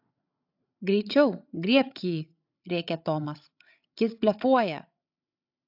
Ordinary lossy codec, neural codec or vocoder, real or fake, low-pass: AAC, 48 kbps; codec, 16 kHz, 16 kbps, FreqCodec, larger model; fake; 5.4 kHz